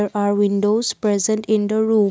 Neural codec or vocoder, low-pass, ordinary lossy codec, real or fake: none; none; none; real